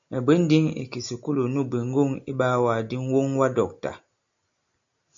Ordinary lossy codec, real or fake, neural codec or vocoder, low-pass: AAC, 64 kbps; real; none; 7.2 kHz